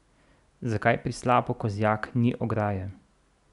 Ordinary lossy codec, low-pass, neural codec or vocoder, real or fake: none; 10.8 kHz; none; real